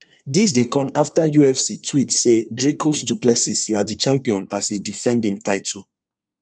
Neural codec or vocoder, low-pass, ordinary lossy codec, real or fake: codec, 24 kHz, 1 kbps, SNAC; 9.9 kHz; none; fake